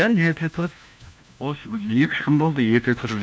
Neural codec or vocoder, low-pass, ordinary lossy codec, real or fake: codec, 16 kHz, 1 kbps, FunCodec, trained on LibriTTS, 50 frames a second; none; none; fake